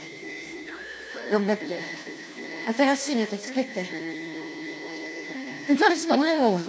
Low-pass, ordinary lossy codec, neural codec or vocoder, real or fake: none; none; codec, 16 kHz, 1 kbps, FunCodec, trained on LibriTTS, 50 frames a second; fake